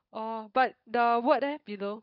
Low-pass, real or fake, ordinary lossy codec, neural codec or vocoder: 5.4 kHz; fake; none; codec, 16 kHz, 16 kbps, FunCodec, trained on LibriTTS, 50 frames a second